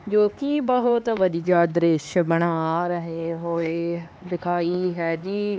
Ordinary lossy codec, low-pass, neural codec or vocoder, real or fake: none; none; codec, 16 kHz, 4 kbps, X-Codec, HuBERT features, trained on LibriSpeech; fake